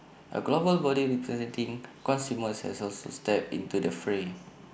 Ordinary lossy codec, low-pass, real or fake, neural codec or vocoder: none; none; real; none